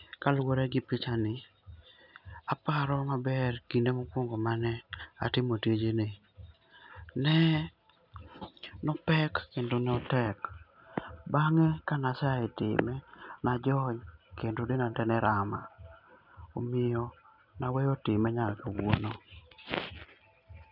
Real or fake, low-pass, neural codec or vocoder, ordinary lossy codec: real; 5.4 kHz; none; none